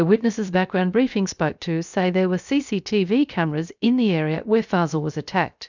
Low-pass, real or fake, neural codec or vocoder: 7.2 kHz; fake; codec, 16 kHz, 0.7 kbps, FocalCodec